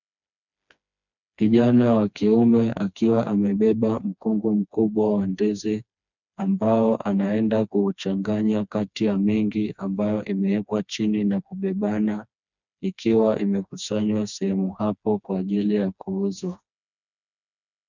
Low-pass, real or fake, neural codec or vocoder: 7.2 kHz; fake; codec, 16 kHz, 2 kbps, FreqCodec, smaller model